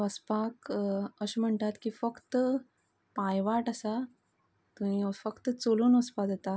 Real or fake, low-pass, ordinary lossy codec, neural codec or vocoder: real; none; none; none